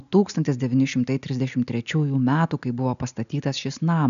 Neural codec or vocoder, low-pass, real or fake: none; 7.2 kHz; real